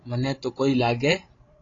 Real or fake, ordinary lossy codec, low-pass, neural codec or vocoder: fake; AAC, 32 kbps; 7.2 kHz; codec, 16 kHz, 16 kbps, FreqCodec, larger model